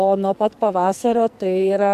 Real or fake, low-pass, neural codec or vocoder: fake; 14.4 kHz; codec, 44.1 kHz, 2.6 kbps, SNAC